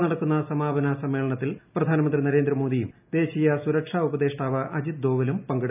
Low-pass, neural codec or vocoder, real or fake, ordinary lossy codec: 3.6 kHz; none; real; none